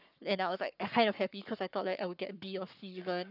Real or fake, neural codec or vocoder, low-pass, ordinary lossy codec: fake; codec, 44.1 kHz, 7.8 kbps, Pupu-Codec; 5.4 kHz; none